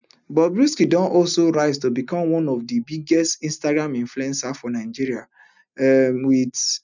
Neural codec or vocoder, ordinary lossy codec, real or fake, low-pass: none; none; real; 7.2 kHz